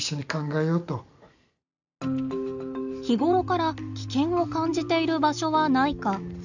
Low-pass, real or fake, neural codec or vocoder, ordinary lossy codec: 7.2 kHz; real; none; none